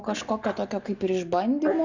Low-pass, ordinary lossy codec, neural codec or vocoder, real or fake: 7.2 kHz; Opus, 64 kbps; none; real